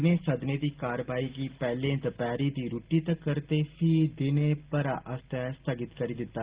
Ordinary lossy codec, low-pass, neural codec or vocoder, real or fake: Opus, 16 kbps; 3.6 kHz; none; real